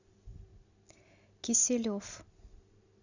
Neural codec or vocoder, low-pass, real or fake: none; 7.2 kHz; real